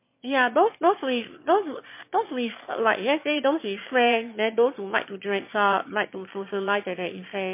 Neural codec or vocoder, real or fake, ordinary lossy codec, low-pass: autoencoder, 22.05 kHz, a latent of 192 numbers a frame, VITS, trained on one speaker; fake; MP3, 24 kbps; 3.6 kHz